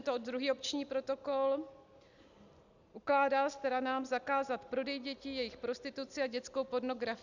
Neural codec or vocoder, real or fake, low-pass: none; real; 7.2 kHz